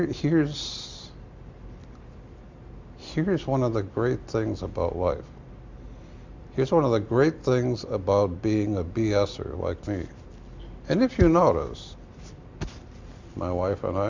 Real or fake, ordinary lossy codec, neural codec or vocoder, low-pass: real; MP3, 64 kbps; none; 7.2 kHz